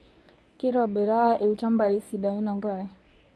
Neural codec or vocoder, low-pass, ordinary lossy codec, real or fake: codec, 24 kHz, 0.9 kbps, WavTokenizer, medium speech release version 1; none; none; fake